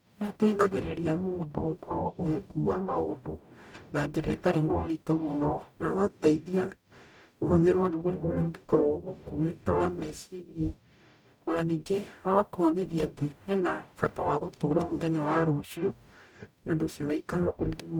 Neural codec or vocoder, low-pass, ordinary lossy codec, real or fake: codec, 44.1 kHz, 0.9 kbps, DAC; 19.8 kHz; none; fake